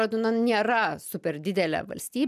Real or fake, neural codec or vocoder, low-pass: real; none; 14.4 kHz